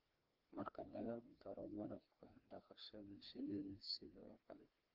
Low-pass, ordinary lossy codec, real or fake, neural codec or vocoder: 5.4 kHz; Opus, 32 kbps; fake; codec, 16 kHz, 2 kbps, FreqCodec, larger model